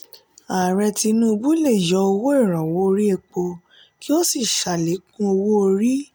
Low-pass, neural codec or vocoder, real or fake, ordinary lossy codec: none; none; real; none